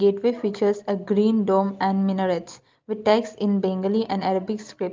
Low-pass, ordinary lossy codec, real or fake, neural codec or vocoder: 7.2 kHz; Opus, 32 kbps; real; none